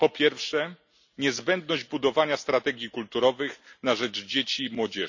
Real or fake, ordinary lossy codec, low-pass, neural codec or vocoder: real; none; 7.2 kHz; none